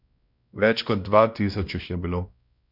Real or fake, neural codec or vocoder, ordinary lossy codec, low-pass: fake; codec, 16 kHz, 1 kbps, X-Codec, WavLM features, trained on Multilingual LibriSpeech; none; 5.4 kHz